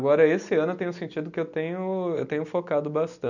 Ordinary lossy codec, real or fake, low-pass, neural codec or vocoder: none; real; 7.2 kHz; none